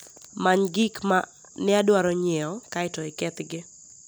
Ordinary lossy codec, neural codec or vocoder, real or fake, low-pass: none; none; real; none